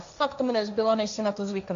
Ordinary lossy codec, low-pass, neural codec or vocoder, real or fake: AAC, 64 kbps; 7.2 kHz; codec, 16 kHz, 1.1 kbps, Voila-Tokenizer; fake